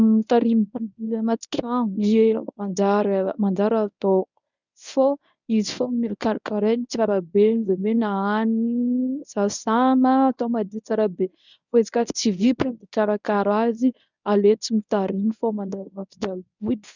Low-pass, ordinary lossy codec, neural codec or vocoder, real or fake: 7.2 kHz; none; codec, 24 kHz, 0.9 kbps, WavTokenizer, medium speech release version 1; fake